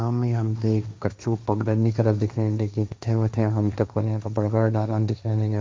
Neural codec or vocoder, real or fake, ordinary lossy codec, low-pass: codec, 16 kHz, 1.1 kbps, Voila-Tokenizer; fake; none; none